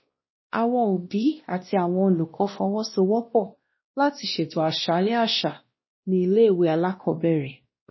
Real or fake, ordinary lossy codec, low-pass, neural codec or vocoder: fake; MP3, 24 kbps; 7.2 kHz; codec, 16 kHz, 1 kbps, X-Codec, WavLM features, trained on Multilingual LibriSpeech